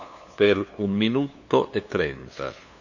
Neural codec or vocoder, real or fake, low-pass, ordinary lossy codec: codec, 16 kHz, 2 kbps, FunCodec, trained on LibriTTS, 25 frames a second; fake; 7.2 kHz; none